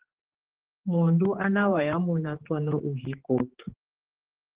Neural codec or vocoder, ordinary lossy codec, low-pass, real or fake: codec, 16 kHz, 4 kbps, X-Codec, HuBERT features, trained on general audio; Opus, 16 kbps; 3.6 kHz; fake